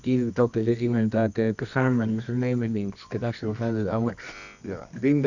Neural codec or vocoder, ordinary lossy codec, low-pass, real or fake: codec, 24 kHz, 0.9 kbps, WavTokenizer, medium music audio release; none; 7.2 kHz; fake